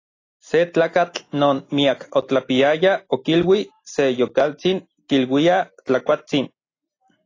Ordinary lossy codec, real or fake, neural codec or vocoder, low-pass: AAC, 32 kbps; real; none; 7.2 kHz